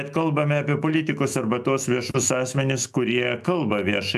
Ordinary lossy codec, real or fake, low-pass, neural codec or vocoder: AAC, 96 kbps; fake; 14.4 kHz; autoencoder, 48 kHz, 128 numbers a frame, DAC-VAE, trained on Japanese speech